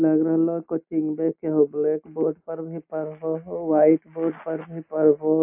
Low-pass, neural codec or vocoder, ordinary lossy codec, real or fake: 3.6 kHz; none; AAC, 32 kbps; real